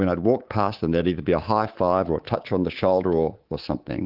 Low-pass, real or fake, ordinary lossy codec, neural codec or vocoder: 5.4 kHz; fake; Opus, 16 kbps; codec, 24 kHz, 3.1 kbps, DualCodec